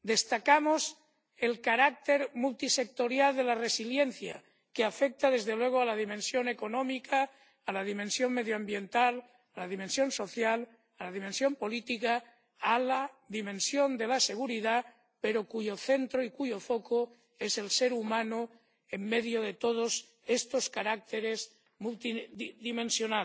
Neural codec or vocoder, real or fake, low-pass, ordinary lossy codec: none; real; none; none